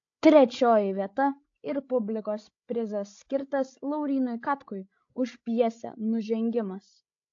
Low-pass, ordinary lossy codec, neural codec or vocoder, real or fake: 7.2 kHz; AAC, 48 kbps; codec, 16 kHz, 16 kbps, FreqCodec, larger model; fake